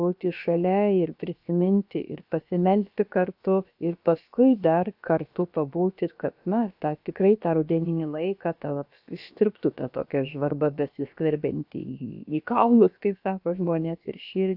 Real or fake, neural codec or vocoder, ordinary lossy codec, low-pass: fake; codec, 16 kHz, about 1 kbps, DyCAST, with the encoder's durations; AAC, 48 kbps; 5.4 kHz